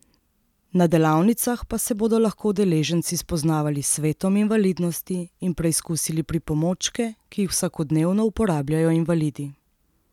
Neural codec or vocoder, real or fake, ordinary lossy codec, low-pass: none; real; none; 19.8 kHz